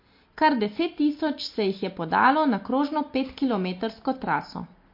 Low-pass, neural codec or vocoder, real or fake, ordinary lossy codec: 5.4 kHz; none; real; MP3, 32 kbps